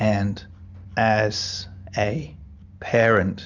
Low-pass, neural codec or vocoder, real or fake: 7.2 kHz; none; real